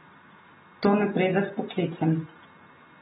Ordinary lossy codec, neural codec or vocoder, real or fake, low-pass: AAC, 16 kbps; none; real; 19.8 kHz